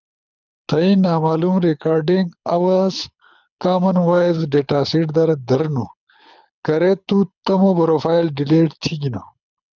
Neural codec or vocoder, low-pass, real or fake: codec, 24 kHz, 6 kbps, HILCodec; 7.2 kHz; fake